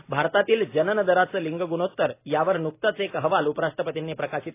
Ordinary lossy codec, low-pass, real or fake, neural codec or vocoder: AAC, 24 kbps; 3.6 kHz; real; none